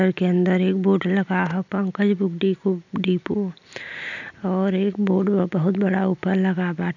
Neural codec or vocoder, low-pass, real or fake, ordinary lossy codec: none; 7.2 kHz; real; none